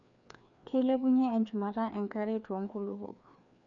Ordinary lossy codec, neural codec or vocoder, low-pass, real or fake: none; codec, 16 kHz, 2 kbps, FreqCodec, larger model; 7.2 kHz; fake